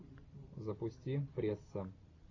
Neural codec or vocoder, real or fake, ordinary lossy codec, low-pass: none; real; AAC, 32 kbps; 7.2 kHz